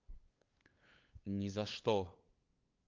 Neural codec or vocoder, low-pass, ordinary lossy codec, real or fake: codec, 16 kHz, 8 kbps, FunCodec, trained on LibriTTS, 25 frames a second; 7.2 kHz; Opus, 24 kbps; fake